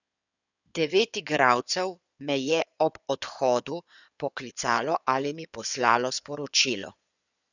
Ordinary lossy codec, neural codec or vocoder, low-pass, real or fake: none; codec, 16 kHz in and 24 kHz out, 2.2 kbps, FireRedTTS-2 codec; 7.2 kHz; fake